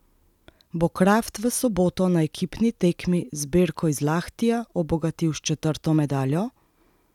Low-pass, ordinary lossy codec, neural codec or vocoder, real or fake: 19.8 kHz; none; none; real